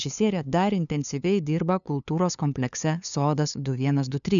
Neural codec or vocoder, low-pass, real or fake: codec, 16 kHz, 2 kbps, FunCodec, trained on LibriTTS, 25 frames a second; 7.2 kHz; fake